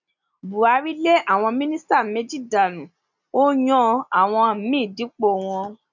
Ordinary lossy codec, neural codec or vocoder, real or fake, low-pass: none; none; real; 7.2 kHz